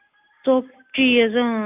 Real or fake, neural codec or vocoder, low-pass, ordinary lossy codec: real; none; 3.6 kHz; AAC, 32 kbps